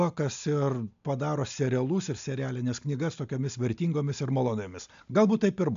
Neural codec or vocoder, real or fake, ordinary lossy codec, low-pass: none; real; MP3, 64 kbps; 7.2 kHz